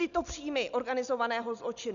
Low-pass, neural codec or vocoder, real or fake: 7.2 kHz; none; real